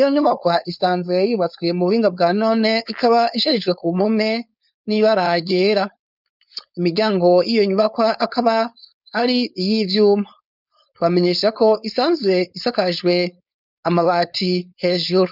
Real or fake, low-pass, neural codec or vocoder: fake; 5.4 kHz; codec, 16 kHz, 4.8 kbps, FACodec